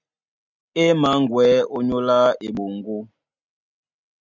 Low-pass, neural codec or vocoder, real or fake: 7.2 kHz; none; real